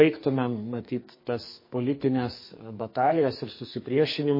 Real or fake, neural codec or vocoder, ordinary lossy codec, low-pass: fake; codec, 44.1 kHz, 2.6 kbps, SNAC; MP3, 24 kbps; 5.4 kHz